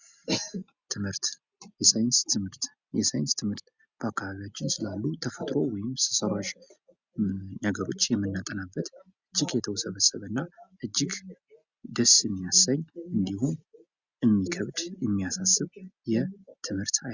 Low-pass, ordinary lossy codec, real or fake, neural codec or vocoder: 7.2 kHz; Opus, 64 kbps; real; none